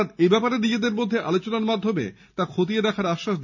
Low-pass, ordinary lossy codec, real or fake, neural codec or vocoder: 7.2 kHz; none; real; none